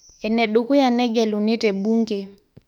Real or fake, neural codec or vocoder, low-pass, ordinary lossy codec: fake; autoencoder, 48 kHz, 32 numbers a frame, DAC-VAE, trained on Japanese speech; 19.8 kHz; none